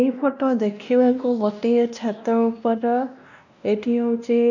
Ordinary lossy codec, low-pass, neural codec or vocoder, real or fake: none; 7.2 kHz; codec, 16 kHz, 1 kbps, X-Codec, WavLM features, trained on Multilingual LibriSpeech; fake